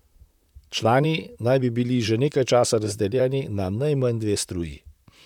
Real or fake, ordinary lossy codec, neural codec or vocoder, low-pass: fake; none; vocoder, 44.1 kHz, 128 mel bands, Pupu-Vocoder; 19.8 kHz